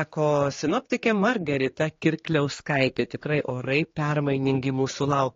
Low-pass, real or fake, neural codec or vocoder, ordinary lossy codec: 7.2 kHz; fake; codec, 16 kHz, 4 kbps, X-Codec, HuBERT features, trained on general audio; AAC, 32 kbps